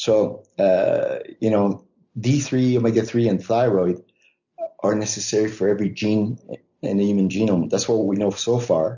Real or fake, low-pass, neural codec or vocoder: real; 7.2 kHz; none